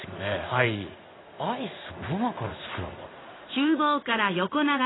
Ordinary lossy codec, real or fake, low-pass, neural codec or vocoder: AAC, 16 kbps; fake; 7.2 kHz; codec, 16 kHz in and 24 kHz out, 1 kbps, XY-Tokenizer